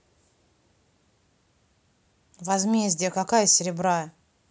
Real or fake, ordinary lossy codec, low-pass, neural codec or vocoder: real; none; none; none